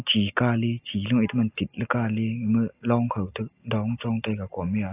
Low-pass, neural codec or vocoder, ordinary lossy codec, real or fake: 3.6 kHz; none; AAC, 24 kbps; real